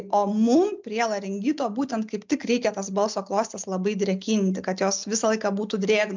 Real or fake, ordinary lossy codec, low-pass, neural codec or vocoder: real; MP3, 64 kbps; 7.2 kHz; none